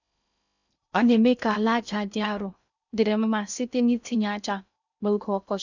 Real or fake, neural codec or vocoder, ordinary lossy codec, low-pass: fake; codec, 16 kHz in and 24 kHz out, 0.6 kbps, FocalCodec, streaming, 2048 codes; none; 7.2 kHz